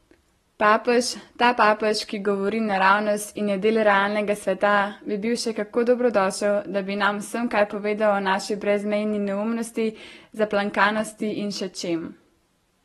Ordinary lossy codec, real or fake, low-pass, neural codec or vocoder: AAC, 32 kbps; real; 19.8 kHz; none